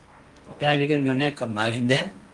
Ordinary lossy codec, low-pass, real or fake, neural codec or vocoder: Opus, 32 kbps; 10.8 kHz; fake; codec, 16 kHz in and 24 kHz out, 0.8 kbps, FocalCodec, streaming, 65536 codes